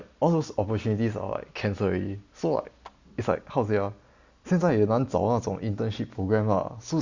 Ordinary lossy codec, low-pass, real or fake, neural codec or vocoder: Opus, 64 kbps; 7.2 kHz; real; none